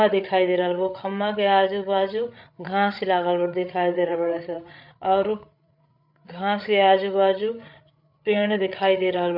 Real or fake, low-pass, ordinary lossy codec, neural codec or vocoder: fake; 5.4 kHz; none; codec, 16 kHz, 8 kbps, FreqCodec, larger model